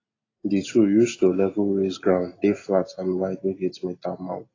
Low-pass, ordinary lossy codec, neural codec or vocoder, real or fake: 7.2 kHz; AAC, 32 kbps; none; real